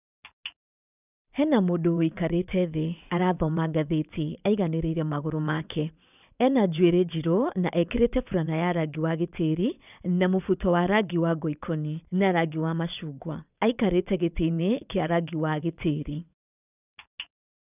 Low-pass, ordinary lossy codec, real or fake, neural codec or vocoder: 3.6 kHz; none; fake; vocoder, 22.05 kHz, 80 mel bands, WaveNeXt